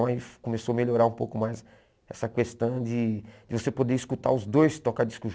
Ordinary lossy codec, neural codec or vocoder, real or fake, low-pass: none; none; real; none